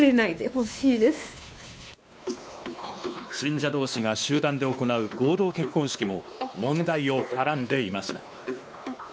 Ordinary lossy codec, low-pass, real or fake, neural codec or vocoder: none; none; fake; codec, 16 kHz, 2 kbps, X-Codec, WavLM features, trained on Multilingual LibriSpeech